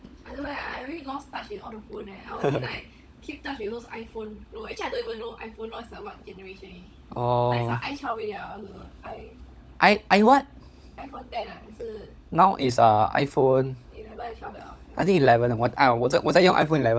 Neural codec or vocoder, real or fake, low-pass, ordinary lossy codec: codec, 16 kHz, 16 kbps, FunCodec, trained on LibriTTS, 50 frames a second; fake; none; none